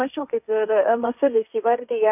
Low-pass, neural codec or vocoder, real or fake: 3.6 kHz; codec, 16 kHz, 1.1 kbps, Voila-Tokenizer; fake